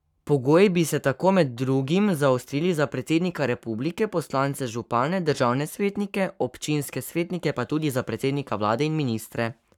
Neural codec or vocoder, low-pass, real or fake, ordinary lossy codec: codec, 44.1 kHz, 7.8 kbps, Pupu-Codec; 19.8 kHz; fake; none